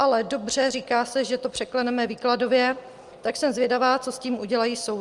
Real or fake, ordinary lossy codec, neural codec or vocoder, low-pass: real; Opus, 32 kbps; none; 10.8 kHz